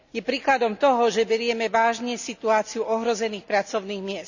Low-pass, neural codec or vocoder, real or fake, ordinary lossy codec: 7.2 kHz; none; real; none